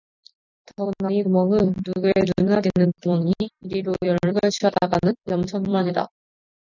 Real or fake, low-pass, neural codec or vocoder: fake; 7.2 kHz; vocoder, 44.1 kHz, 128 mel bands every 256 samples, BigVGAN v2